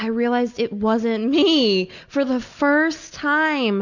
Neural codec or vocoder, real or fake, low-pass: none; real; 7.2 kHz